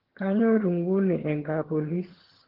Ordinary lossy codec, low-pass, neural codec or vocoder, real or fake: Opus, 16 kbps; 5.4 kHz; vocoder, 22.05 kHz, 80 mel bands, HiFi-GAN; fake